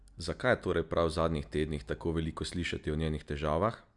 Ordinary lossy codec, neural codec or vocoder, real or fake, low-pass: none; none; real; 10.8 kHz